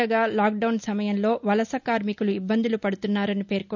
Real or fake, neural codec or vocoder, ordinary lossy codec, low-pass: real; none; none; 7.2 kHz